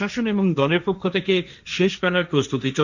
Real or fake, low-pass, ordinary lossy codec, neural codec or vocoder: fake; 7.2 kHz; none; codec, 16 kHz, 1.1 kbps, Voila-Tokenizer